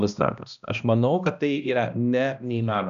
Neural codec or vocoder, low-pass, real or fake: codec, 16 kHz, 1 kbps, X-Codec, HuBERT features, trained on balanced general audio; 7.2 kHz; fake